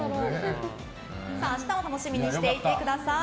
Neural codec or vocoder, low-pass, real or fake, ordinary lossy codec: none; none; real; none